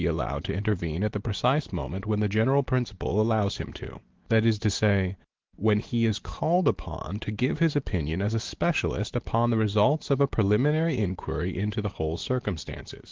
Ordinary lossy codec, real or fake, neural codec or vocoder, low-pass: Opus, 16 kbps; real; none; 7.2 kHz